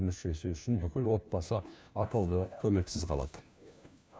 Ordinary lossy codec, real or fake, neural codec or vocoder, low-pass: none; fake; codec, 16 kHz, 1 kbps, FunCodec, trained on LibriTTS, 50 frames a second; none